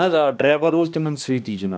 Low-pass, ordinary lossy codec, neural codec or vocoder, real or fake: none; none; codec, 16 kHz, 1 kbps, X-Codec, HuBERT features, trained on balanced general audio; fake